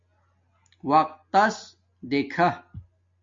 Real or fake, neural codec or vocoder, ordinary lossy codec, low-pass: real; none; MP3, 48 kbps; 7.2 kHz